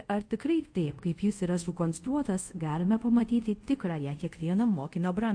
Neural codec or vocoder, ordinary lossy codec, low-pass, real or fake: codec, 24 kHz, 0.5 kbps, DualCodec; MP3, 48 kbps; 9.9 kHz; fake